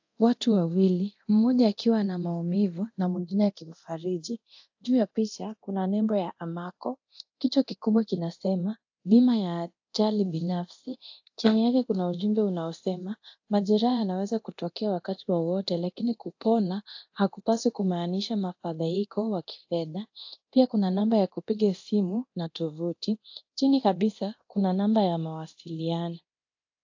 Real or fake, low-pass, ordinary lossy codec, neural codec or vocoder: fake; 7.2 kHz; AAC, 48 kbps; codec, 24 kHz, 0.9 kbps, DualCodec